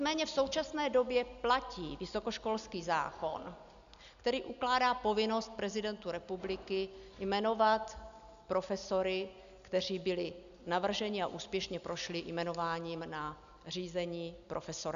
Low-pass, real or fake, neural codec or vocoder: 7.2 kHz; real; none